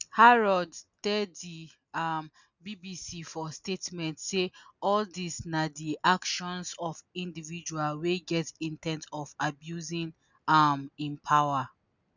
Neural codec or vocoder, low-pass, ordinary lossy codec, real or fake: none; 7.2 kHz; none; real